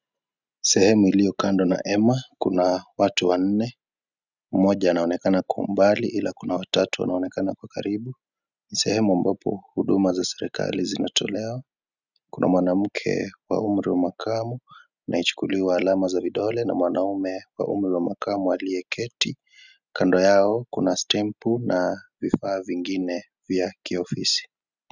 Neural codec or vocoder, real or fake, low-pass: none; real; 7.2 kHz